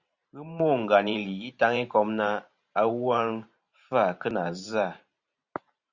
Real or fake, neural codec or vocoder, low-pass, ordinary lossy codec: fake; vocoder, 44.1 kHz, 128 mel bands every 512 samples, BigVGAN v2; 7.2 kHz; Opus, 64 kbps